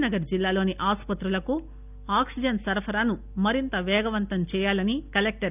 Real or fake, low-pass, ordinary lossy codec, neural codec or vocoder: real; 3.6 kHz; none; none